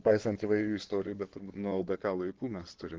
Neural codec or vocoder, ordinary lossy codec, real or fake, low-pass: codec, 16 kHz in and 24 kHz out, 2.2 kbps, FireRedTTS-2 codec; Opus, 16 kbps; fake; 7.2 kHz